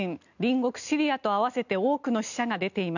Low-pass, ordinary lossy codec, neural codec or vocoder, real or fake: 7.2 kHz; none; none; real